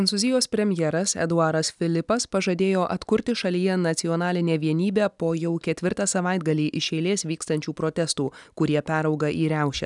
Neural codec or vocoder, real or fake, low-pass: none; real; 10.8 kHz